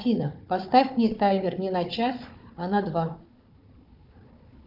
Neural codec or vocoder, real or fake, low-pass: codec, 16 kHz, 4 kbps, FunCodec, trained on Chinese and English, 50 frames a second; fake; 5.4 kHz